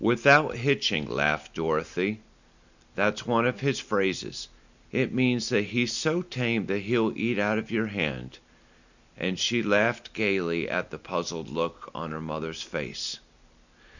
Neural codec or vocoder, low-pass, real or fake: none; 7.2 kHz; real